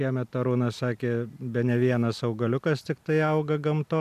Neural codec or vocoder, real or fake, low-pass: none; real; 14.4 kHz